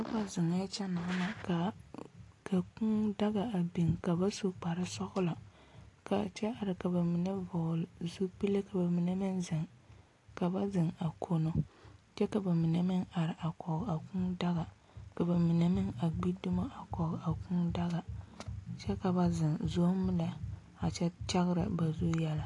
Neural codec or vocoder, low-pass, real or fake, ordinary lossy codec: none; 10.8 kHz; real; AAC, 48 kbps